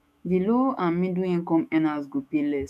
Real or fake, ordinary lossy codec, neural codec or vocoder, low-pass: fake; none; autoencoder, 48 kHz, 128 numbers a frame, DAC-VAE, trained on Japanese speech; 14.4 kHz